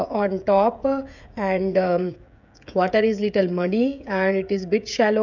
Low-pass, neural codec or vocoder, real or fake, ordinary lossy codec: 7.2 kHz; codec, 16 kHz, 16 kbps, FreqCodec, smaller model; fake; none